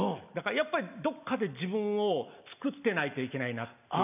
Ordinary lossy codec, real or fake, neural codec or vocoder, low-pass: none; real; none; 3.6 kHz